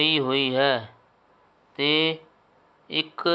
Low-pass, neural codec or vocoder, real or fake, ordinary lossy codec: none; none; real; none